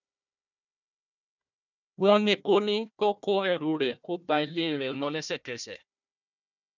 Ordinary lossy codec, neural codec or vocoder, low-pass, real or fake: none; codec, 16 kHz, 1 kbps, FunCodec, trained on Chinese and English, 50 frames a second; 7.2 kHz; fake